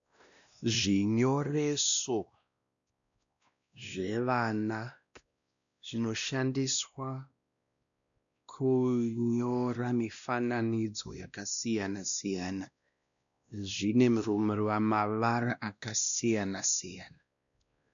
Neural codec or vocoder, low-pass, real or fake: codec, 16 kHz, 1 kbps, X-Codec, WavLM features, trained on Multilingual LibriSpeech; 7.2 kHz; fake